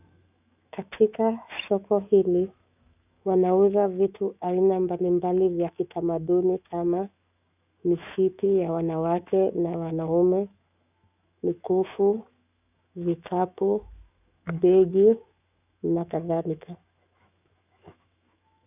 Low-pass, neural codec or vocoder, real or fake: 3.6 kHz; autoencoder, 48 kHz, 128 numbers a frame, DAC-VAE, trained on Japanese speech; fake